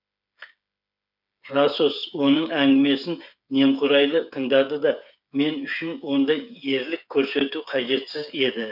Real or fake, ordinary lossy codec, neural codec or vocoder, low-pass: fake; none; codec, 16 kHz, 8 kbps, FreqCodec, smaller model; 5.4 kHz